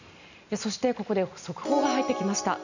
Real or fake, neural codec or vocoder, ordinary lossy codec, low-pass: real; none; none; 7.2 kHz